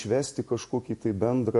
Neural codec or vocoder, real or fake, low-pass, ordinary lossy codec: none; real; 14.4 kHz; MP3, 48 kbps